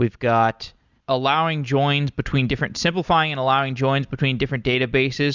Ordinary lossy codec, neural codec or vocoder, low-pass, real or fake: Opus, 64 kbps; none; 7.2 kHz; real